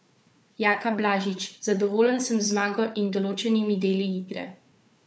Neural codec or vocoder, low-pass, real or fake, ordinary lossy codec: codec, 16 kHz, 4 kbps, FunCodec, trained on Chinese and English, 50 frames a second; none; fake; none